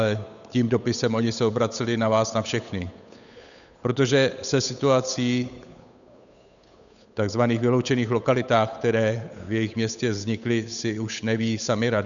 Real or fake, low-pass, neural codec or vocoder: fake; 7.2 kHz; codec, 16 kHz, 8 kbps, FunCodec, trained on Chinese and English, 25 frames a second